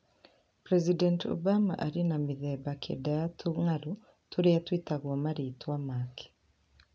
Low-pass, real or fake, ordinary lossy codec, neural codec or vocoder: none; real; none; none